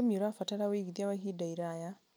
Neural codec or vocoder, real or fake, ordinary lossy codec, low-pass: none; real; none; none